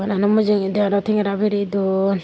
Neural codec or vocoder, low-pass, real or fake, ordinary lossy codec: none; none; real; none